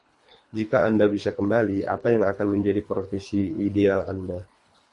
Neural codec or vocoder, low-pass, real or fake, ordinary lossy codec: codec, 24 kHz, 3 kbps, HILCodec; 10.8 kHz; fake; MP3, 48 kbps